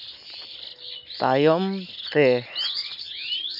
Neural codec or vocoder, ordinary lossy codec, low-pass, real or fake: none; none; 5.4 kHz; real